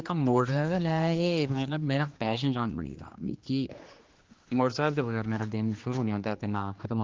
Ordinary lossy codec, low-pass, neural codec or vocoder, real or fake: Opus, 32 kbps; 7.2 kHz; codec, 16 kHz, 2 kbps, X-Codec, HuBERT features, trained on general audio; fake